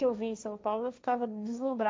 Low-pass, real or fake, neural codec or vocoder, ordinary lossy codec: none; fake; codec, 16 kHz, 1.1 kbps, Voila-Tokenizer; none